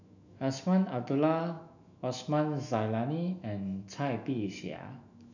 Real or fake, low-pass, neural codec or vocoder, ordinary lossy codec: fake; 7.2 kHz; autoencoder, 48 kHz, 128 numbers a frame, DAC-VAE, trained on Japanese speech; none